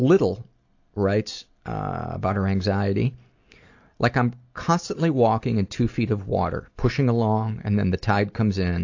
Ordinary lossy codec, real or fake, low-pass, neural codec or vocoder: AAC, 48 kbps; real; 7.2 kHz; none